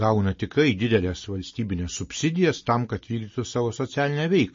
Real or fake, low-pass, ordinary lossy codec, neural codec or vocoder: real; 7.2 kHz; MP3, 32 kbps; none